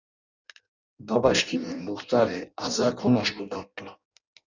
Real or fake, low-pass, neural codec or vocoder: fake; 7.2 kHz; codec, 16 kHz in and 24 kHz out, 0.6 kbps, FireRedTTS-2 codec